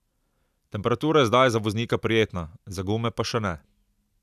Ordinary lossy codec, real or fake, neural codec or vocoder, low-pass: none; real; none; 14.4 kHz